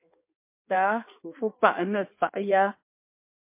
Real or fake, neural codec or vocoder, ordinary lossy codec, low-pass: fake; codec, 16 kHz in and 24 kHz out, 1.1 kbps, FireRedTTS-2 codec; MP3, 24 kbps; 3.6 kHz